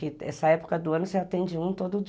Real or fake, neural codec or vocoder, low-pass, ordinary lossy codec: real; none; none; none